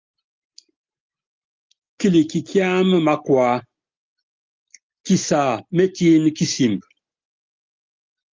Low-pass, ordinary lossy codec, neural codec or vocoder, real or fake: 7.2 kHz; Opus, 24 kbps; none; real